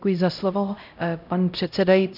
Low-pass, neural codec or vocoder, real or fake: 5.4 kHz; codec, 16 kHz, 0.5 kbps, X-Codec, HuBERT features, trained on LibriSpeech; fake